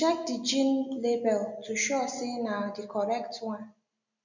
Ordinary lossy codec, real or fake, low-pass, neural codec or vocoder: none; real; 7.2 kHz; none